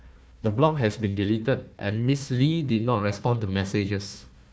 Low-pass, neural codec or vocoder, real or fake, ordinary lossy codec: none; codec, 16 kHz, 1 kbps, FunCodec, trained on Chinese and English, 50 frames a second; fake; none